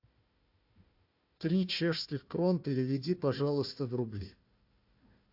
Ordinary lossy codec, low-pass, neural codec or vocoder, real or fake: AAC, 48 kbps; 5.4 kHz; codec, 16 kHz, 1 kbps, FunCodec, trained on Chinese and English, 50 frames a second; fake